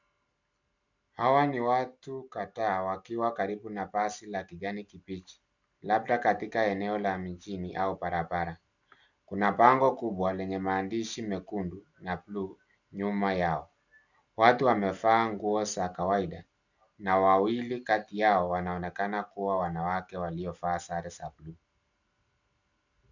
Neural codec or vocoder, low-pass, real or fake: none; 7.2 kHz; real